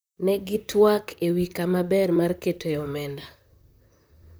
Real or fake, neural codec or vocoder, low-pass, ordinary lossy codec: fake; vocoder, 44.1 kHz, 128 mel bands, Pupu-Vocoder; none; none